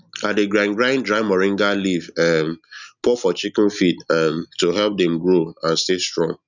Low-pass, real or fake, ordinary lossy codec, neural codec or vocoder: 7.2 kHz; real; none; none